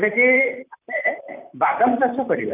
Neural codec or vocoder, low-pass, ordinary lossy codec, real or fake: vocoder, 44.1 kHz, 128 mel bands, Pupu-Vocoder; 3.6 kHz; none; fake